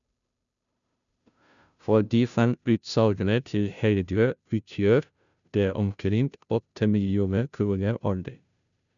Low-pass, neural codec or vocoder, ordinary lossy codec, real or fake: 7.2 kHz; codec, 16 kHz, 0.5 kbps, FunCodec, trained on Chinese and English, 25 frames a second; none; fake